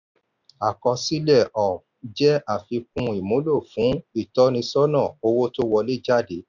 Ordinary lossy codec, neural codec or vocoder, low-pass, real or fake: none; none; 7.2 kHz; real